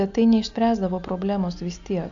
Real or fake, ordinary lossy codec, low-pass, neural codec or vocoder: real; AAC, 64 kbps; 7.2 kHz; none